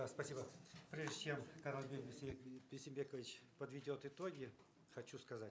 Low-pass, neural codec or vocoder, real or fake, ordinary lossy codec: none; none; real; none